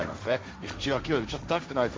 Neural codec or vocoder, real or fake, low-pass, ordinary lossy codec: codec, 16 kHz, 1.1 kbps, Voila-Tokenizer; fake; none; none